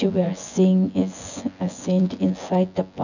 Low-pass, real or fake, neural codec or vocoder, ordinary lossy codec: 7.2 kHz; fake; vocoder, 24 kHz, 100 mel bands, Vocos; none